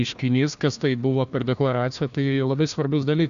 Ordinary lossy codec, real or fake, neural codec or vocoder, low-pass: AAC, 96 kbps; fake; codec, 16 kHz, 1 kbps, FunCodec, trained on Chinese and English, 50 frames a second; 7.2 kHz